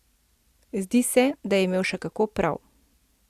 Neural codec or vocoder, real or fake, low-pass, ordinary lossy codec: none; real; 14.4 kHz; Opus, 64 kbps